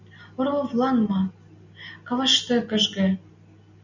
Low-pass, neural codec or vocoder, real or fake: 7.2 kHz; none; real